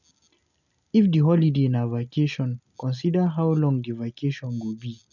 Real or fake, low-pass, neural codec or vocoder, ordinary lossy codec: real; 7.2 kHz; none; none